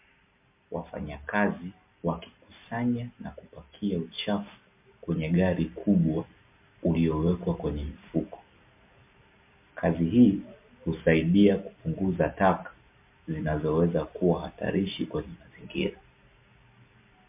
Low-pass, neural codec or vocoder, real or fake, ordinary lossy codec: 3.6 kHz; none; real; MP3, 32 kbps